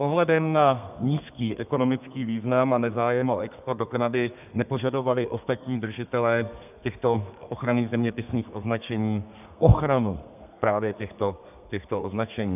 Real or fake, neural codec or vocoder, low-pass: fake; codec, 32 kHz, 1.9 kbps, SNAC; 3.6 kHz